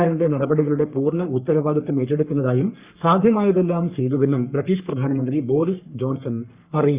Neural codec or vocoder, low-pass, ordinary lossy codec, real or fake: codec, 44.1 kHz, 3.4 kbps, Pupu-Codec; 3.6 kHz; Opus, 64 kbps; fake